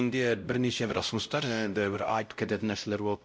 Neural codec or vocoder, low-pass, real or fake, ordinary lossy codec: codec, 16 kHz, 0.5 kbps, X-Codec, WavLM features, trained on Multilingual LibriSpeech; none; fake; none